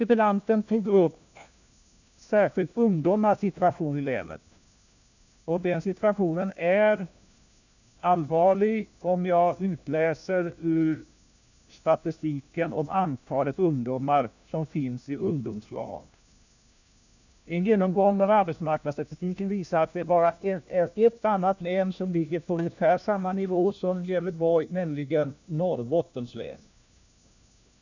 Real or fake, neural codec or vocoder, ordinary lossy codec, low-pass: fake; codec, 16 kHz, 1 kbps, FunCodec, trained on LibriTTS, 50 frames a second; none; 7.2 kHz